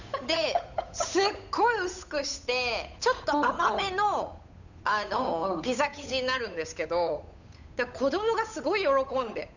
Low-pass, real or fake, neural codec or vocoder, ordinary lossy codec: 7.2 kHz; fake; codec, 16 kHz, 8 kbps, FunCodec, trained on Chinese and English, 25 frames a second; none